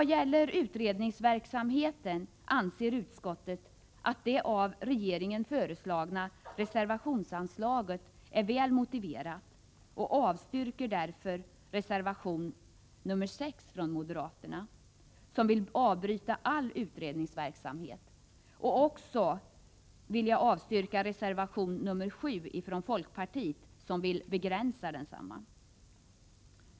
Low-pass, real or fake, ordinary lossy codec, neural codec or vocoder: none; real; none; none